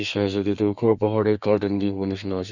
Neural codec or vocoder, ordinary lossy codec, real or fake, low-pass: codec, 32 kHz, 1.9 kbps, SNAC; none; fake; 7.2 kHz